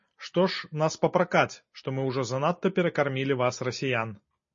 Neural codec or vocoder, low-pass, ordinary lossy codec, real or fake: none; 7.2 kHz; MP3, 32 kbps; real